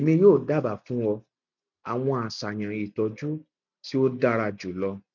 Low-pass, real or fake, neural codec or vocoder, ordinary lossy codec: 7.2 kHz; real; none; none